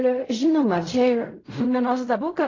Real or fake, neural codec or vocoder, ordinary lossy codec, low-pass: fake; codec, 16 kHz in and 24 kHz out, 0.4 kbps, LongCat-Audio-Codec, fine tuned four codebook decoder; AAC, 32 kbps; 7.2 kHz